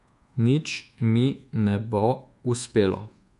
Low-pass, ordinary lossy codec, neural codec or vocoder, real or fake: 10.8 kHz; MP3, 64 kbps; codec, 24 kHz, 1.2 kbps, DualCodec; fake